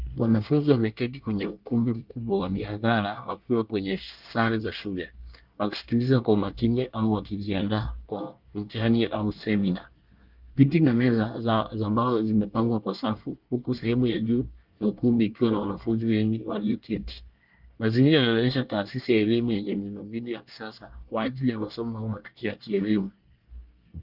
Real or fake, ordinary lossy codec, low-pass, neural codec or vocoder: fake; Opus, 32 kbps; 5.4 kHz; codec, 24 kHz, 1 kbps, SNAC